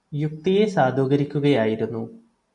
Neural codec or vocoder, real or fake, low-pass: none; real; 10.8 kHz